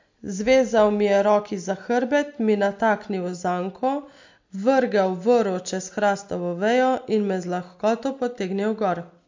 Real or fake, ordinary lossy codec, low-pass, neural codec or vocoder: real; MP3, 48 kbps; 7.2 kHz; none